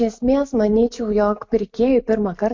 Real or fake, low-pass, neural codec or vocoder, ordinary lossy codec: real; 7.2 kHz; none; MP3, 48 kbps